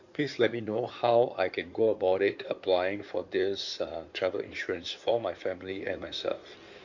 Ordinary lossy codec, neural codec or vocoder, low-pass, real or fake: none; codec, 16 kHz in and 24 kHz out, 2.2 kbps, FireRedTTS-2 codec; 7.2 kHz; fake